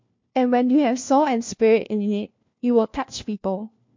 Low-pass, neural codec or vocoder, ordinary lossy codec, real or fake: 7.2 kHz; codec, 16 kHz, 1 kbps, FunCodec, trained on LibriTTS, 50 frames a second; MP3, 48 kbps; fake